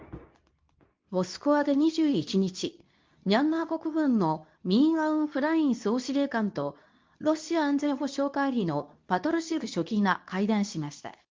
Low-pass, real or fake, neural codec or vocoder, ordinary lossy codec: 7.2 kHz; fake; codec, 24 kHz, 0.9 kbps, WavTokenizer, medium speech release version 2; Opus, 24 kbps